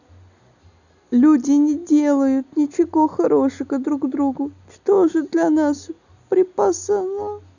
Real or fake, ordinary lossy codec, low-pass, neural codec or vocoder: real; none; 7.2 kHz; none